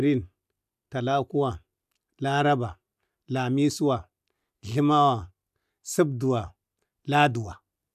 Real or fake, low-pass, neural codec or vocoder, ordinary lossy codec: real; 14.4 kHz; none; none